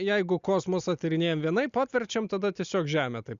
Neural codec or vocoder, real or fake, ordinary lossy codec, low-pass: none; real; Opus, 64 kbps; 7.2 kHz